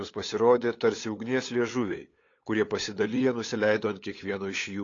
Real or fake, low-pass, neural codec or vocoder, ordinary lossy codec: fake; 7.2 kHz; codec, 16 kHz, 8 kbps, FunCodec, trained on LibriTTS, 25 frames a second; AAC, 32 kbps